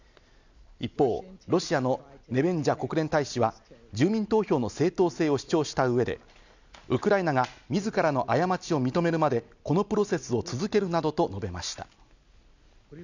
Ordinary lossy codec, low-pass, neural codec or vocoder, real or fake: none; 7.2 kHz; none; real